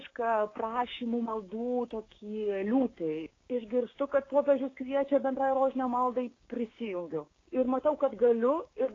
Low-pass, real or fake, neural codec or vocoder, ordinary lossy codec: 7.2 kHz; fake; codec, 16 kHz, 6 kbps, DAC; AAC, 32 kbps